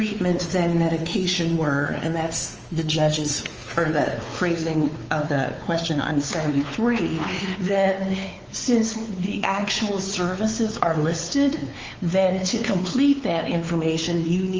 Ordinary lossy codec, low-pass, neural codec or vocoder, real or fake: Opus, 24 kbps; 7.2 kHz; codec, 16 kHz, 4 kbps, X-Codec, WavLM features, trained on Multilingual LibriSpeech; fake